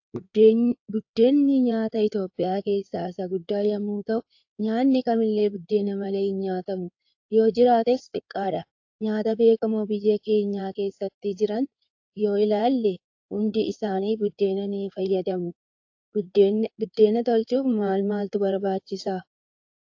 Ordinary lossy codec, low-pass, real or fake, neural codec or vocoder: AAC, 48 kbps; 7.2 kHz; fake; codec, 16 kHz in and 24 kHz out, 2.2 kbps, FireRedTTS-2 codec